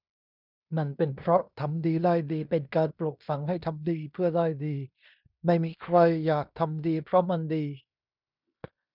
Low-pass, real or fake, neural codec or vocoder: 5.4 kHz; fake; codec, 16 kHz in and 24 kHz out, 0.9 kbps, LongCat-Audio-Codec, fine tuned four codebook decoder